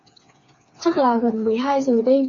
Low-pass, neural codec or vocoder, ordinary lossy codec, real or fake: 7.2 kHz; codec, 16 kHz, 4 kbps, FreqCodec, smaller model; MP3, 48 kbps; fake